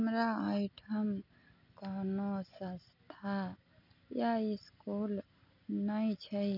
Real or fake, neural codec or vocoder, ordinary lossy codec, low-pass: real; none; none; 5.4 kHz